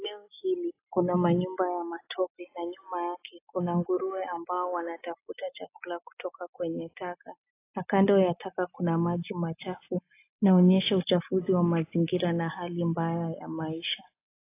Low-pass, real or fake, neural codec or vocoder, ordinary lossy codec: 3.6 kHz; real; none; AAC, 24 kbps